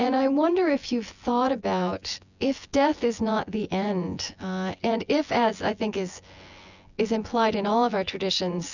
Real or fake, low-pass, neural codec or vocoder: fake; 7.2 kHz; vocoder, 24 kHz, 100 mel bands, Vocos